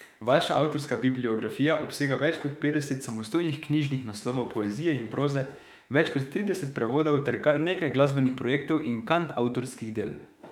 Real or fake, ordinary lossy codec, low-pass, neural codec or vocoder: fake; none; 19.8 kHz; autoencoder, 48 kHz, 32 numbers a frame, DAC-VAE, trained on Japanese speech